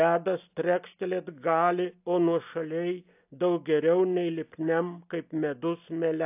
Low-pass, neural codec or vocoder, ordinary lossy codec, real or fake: 3.6 kHz; none; AAC, 32 kbps; real